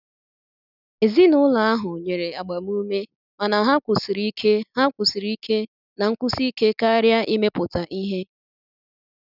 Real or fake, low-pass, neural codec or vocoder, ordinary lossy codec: real; 5.4 kHz; none; none